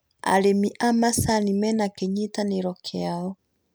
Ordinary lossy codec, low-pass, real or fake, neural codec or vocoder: none; none; real; none